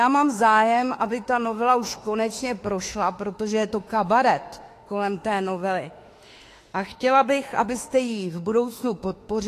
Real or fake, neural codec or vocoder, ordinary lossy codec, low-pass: fake; autoencoder, 48 kHz, 32 numbers a frame, DAC-VAE, trained on Japanese speech; AAC, 48 kbps; 14.4 kHz